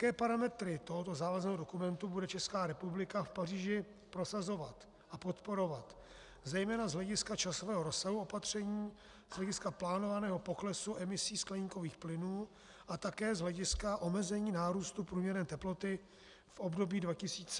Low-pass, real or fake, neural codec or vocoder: 10.8 kHz; real; none